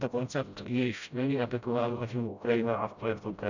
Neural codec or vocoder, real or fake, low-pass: codec, 16 kHz, 0.5 kbps, FreqCodec, smaller model; fake; 7.2 kHz